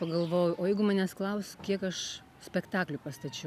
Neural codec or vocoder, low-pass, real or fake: none; 14.4 kHz; real